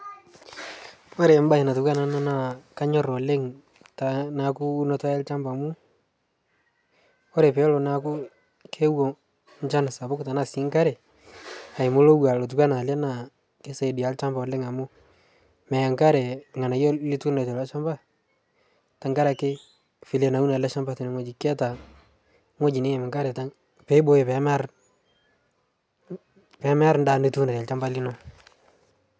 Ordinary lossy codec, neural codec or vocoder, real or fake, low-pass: none; none; real; none